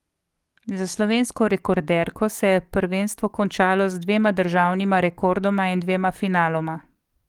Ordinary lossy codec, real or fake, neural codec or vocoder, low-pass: Opus, 24 kbps; fake; codec, 44.1 kHz, 7.8 kbps, DAC; 19.8 kHz